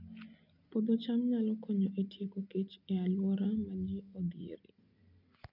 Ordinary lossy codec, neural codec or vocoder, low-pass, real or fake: none; none; 5.4 kHz; real